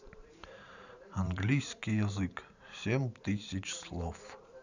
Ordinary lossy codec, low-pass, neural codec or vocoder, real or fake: none; 7.2 kHz; vocoder, 44.1 kHz, 128 mel bands every 512 samples, BigVGAN v2; fake